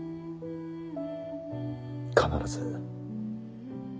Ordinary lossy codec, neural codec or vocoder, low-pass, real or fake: none; none; none; real